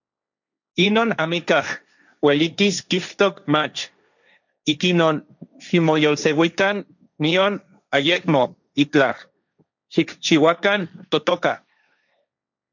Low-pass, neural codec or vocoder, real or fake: 7.2 kHz; codec, 16 kHz, 1.1 kbps, Voila-Tokenizer; fake